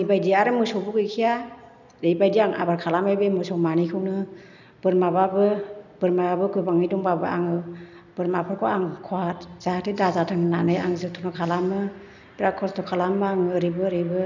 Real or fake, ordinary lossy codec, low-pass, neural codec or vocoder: real; none; 7.2 kHz; none